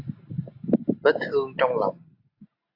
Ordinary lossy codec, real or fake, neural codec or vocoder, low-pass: AAC, 32 kbps; real; none; 5.4 kHz